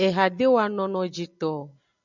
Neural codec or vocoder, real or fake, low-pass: none; real; 7.2 kHz